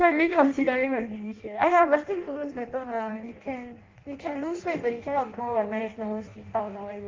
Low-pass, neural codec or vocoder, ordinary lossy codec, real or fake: 7.2 kHz; codec, 16 kHz in and 24 kHz out, 0.6 kbps, FireRedTTS-2 codec; Opus, 32 kbps; fake